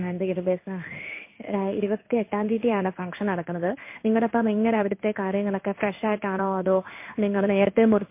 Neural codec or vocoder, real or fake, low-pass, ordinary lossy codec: codec, 16 kHz in and 24 kHz out, 1 kbps, XY-Tokenizer; fake; 3.6 kHz; MP3, 24 kbps